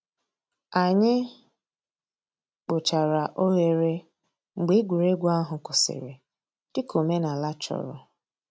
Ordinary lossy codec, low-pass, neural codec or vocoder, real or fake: none; none; none; real